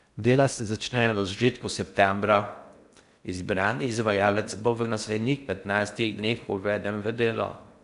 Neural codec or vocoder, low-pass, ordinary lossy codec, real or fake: codec, 16 kHz in and 24 kHz out, 0.6 kbps, FocalCodec, streaming, 4096 codes; 10.8 kHz; none; fake